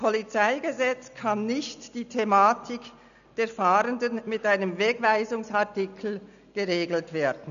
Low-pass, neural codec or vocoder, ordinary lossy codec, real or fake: 7.2 kHz; none; none; real